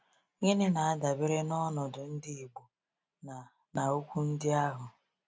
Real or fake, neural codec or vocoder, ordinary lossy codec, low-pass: real; none; none; none